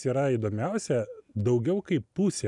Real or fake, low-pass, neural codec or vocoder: real; 10.8 kHz; none